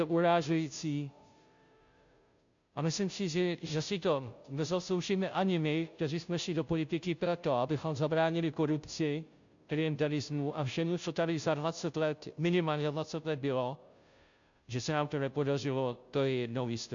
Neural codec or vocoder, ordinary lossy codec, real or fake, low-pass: codec, 16 kHz, 0.5 kbps, FunCodec, trained on Chinese and English, 25 frames a second; AAC, 64 kbps; fake; 7.2 kHz